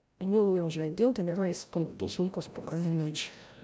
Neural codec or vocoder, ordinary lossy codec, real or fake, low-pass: codec, 16 kHz, 0.5 kbps, FreqCodec, larger model; none; fake; none